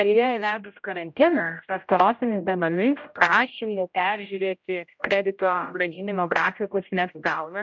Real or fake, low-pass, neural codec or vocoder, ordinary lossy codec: fake; 7.2 kHz; codec, 16 kHz, 0.5 kbps, X-Codec, HuBERT features, trained on general audio; MP3, 64 kbps